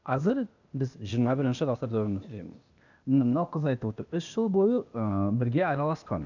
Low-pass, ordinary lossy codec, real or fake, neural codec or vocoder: 7.2 kHz; none; fake; codec, 16 kHz, 0.8 kbps, ZipCodec